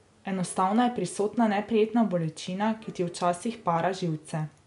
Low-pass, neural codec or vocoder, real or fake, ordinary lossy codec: 10.8 kHz; none; real; none